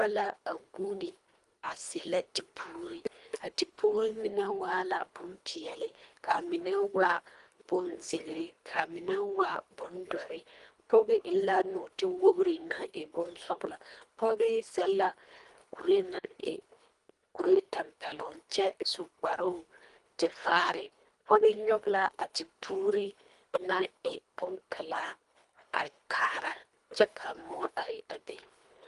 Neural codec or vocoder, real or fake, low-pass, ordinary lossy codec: codec, 24 kHz, 1.5 kbps, HILCodec; fake; 10.8 kHz; Opus, 32 kbps